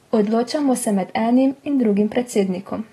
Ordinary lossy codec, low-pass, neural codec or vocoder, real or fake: AAC, 32 kbps; 19.8 kHz; none; real